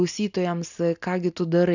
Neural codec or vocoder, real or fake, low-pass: none; real; 7.2 kHz